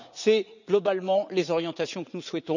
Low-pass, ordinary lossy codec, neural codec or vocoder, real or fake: 7.2 kHz; none; vocoder, 44.1 kHz, 80 mel bands, Vocos; fake